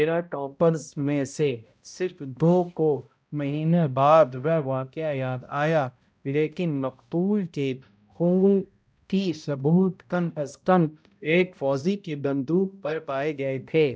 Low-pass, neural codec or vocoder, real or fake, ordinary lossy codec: none; codec, 16 kHz, 0.5 kbps, X-Codec, HuBERT features, trained on balanced general audio; fake; none